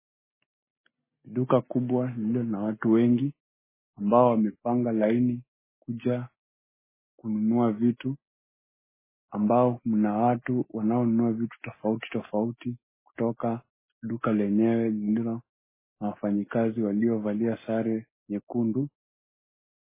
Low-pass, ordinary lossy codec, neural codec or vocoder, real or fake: 3.6 kHz; MP3, 16 kbps; none; real